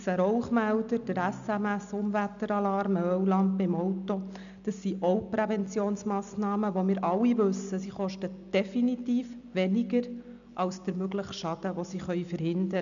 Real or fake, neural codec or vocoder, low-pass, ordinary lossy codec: real; none; 7.2 kHz; none